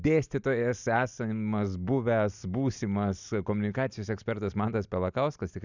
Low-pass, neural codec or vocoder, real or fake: 7.2 kHz; none; real